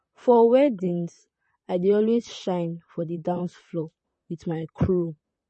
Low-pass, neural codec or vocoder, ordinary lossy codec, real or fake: 10.8 kHz; vocoder, 44.1 kHz, 128 mel bands, Pupu-Vocoder; MP3, 32 kbps; fake